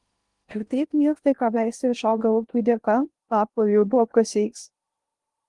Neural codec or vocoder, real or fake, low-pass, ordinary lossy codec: codec, 16 kHz in and 24 kHz out, 0.6 kbps, FocalCodec, streaming, 2048 codes; fake; 10.8 kHz; Opus, 24 kbps